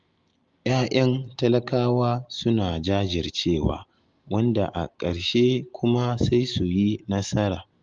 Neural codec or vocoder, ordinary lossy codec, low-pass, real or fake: none; Opus, 32 kbps; 7.2 kHz; real